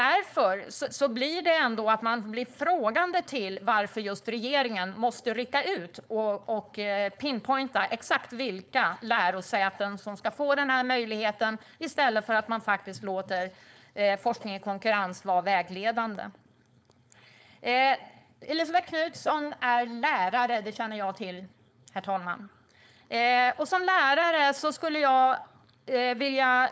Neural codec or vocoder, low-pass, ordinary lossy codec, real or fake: codec, 16 kHz, 4.8 kbps, FACodec; none; none; fake